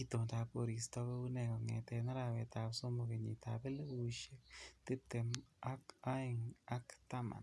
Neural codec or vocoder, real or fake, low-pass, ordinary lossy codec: none; real; none; none